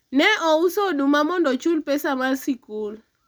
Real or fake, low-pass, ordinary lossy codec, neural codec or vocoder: real; none; none; none